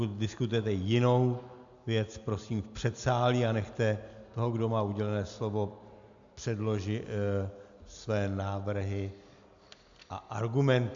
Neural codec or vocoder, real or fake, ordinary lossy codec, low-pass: none; real; AAC, 64 kbps; 7.2 kHz